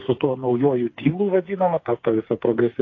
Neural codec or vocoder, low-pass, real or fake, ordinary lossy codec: codec, 16 kHz, 4 kbps, FreqCodec, smaller model; 7.2 kHz; fake; AAC, 32 kbps